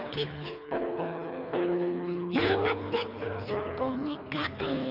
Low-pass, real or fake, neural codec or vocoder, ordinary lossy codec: 5.4 kHz; fake; codec, 24 kHz, 3 kbps, HILCodec; none